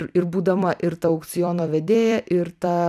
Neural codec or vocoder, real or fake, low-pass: vocoder, 44.1 kHz, 128 mel bands every 256 samples, BigVGAN v2; fake; 14.4 kHz